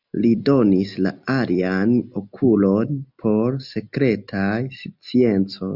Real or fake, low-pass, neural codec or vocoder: real; 5.4 kHz; none